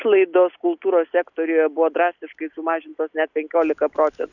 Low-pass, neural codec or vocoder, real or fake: 7.2 kHz; none; real